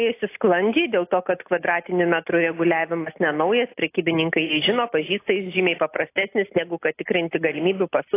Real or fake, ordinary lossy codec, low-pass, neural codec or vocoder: real; AAC, 24 kbps; 3.6 kHz; none